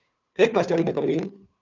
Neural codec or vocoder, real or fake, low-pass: codec, 16 kHz, 2 kbps, FunCodec, trained on Chinese and English, 25 frames a second; fake; 7.2 kHz